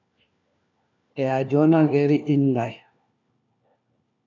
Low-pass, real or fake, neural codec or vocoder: 7.2 kHz; fake; codec, 16 kHz, 1 kbps, FunCodec, trained on LibriTTS, 50 frames a second